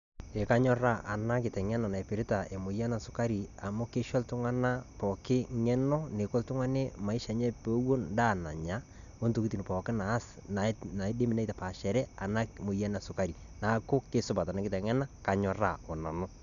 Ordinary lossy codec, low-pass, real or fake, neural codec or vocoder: none; 7.2 kHz; real; none